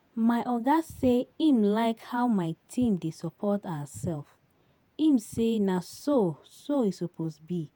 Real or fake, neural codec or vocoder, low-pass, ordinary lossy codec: fake; vocoder, 48 kHz, 128 mel bands, Vocos; none; none